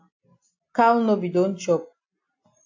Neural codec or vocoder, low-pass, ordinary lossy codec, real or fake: none; 7.2 kHz; AAC, 48 kbps; real